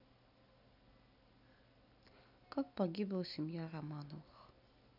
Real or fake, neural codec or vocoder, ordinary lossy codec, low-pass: real; none; none; 5.4 kHz